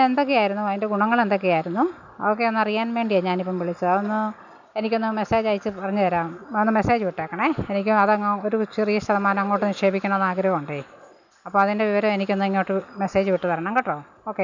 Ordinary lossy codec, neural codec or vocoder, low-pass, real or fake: none; none; 7.2 kHz; real